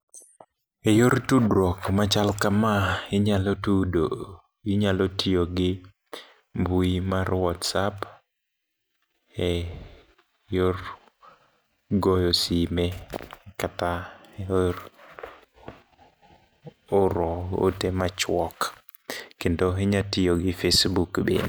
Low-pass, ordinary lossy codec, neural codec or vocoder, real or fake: none; none; none; real